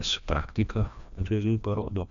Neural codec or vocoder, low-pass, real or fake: codec, 16 kHz, 1 kbps, FreqCodec, larger model; 7.2 kHz; fake